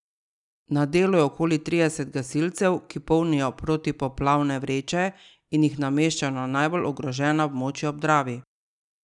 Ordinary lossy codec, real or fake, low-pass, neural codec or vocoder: none; real; 10.8 kHz; none